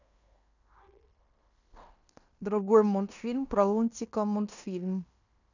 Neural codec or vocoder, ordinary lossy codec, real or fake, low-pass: codec, 16 kHz in and 24 kHz out, 0.9 kbps, LongCat-Audio-Codec, fine tuned four codebook decoder; none; fake; 7.2 kHz